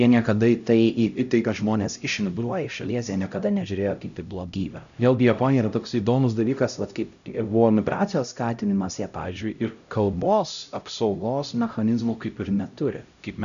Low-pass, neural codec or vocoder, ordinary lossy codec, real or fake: 7.2 kHz; codec, 16 kHz, 0.5 kbps, X-Codec, HuBERT features, trained on LibriSpeech; MP3, 96 kbps; fake